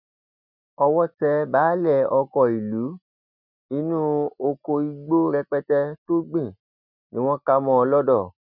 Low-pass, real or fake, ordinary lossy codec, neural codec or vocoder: 5.4 kHz; real; none; none